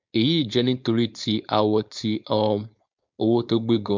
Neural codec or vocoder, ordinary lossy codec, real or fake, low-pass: codec, 16 kHz, 4.8 kbps, FACodec; MP3, 64 kbps; fake; 7.2 kHz